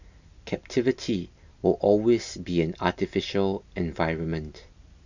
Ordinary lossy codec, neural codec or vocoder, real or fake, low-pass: none; none; real; 7.2 kHz